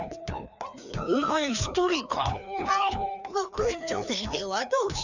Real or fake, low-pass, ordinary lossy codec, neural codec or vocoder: fake; 7.2 kHz; none; codec, 16 kHz, 2 kbps, FreqCodec, larger model